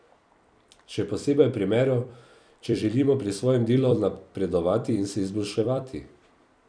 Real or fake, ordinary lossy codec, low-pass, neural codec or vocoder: fake; none; 9.9 kHz; vocoder, 44.1 kHz, 128 mel bands every 256 samples, BigVGAN v2